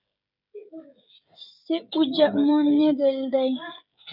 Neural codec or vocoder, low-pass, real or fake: codec, 16 kHz, 16 kbps, FreqCodec, smaller model; 5.4 kHz; fake